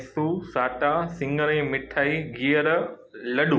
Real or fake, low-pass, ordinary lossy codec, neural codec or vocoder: real; none; none; none